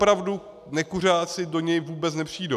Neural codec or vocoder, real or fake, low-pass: none; real; 14.4 kHz